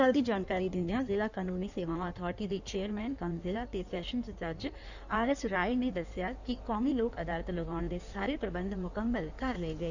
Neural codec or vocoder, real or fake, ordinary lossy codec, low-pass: codec, 16 kHz in and 24 kHz out, 1.1 kbps, FireRedTTS-2 codec; fake; none; 7.2 kHz